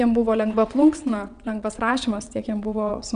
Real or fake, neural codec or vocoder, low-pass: fake; vocoder, 22.05 kHz, 80 mel bands, WaveNeXt; 9.9 kHz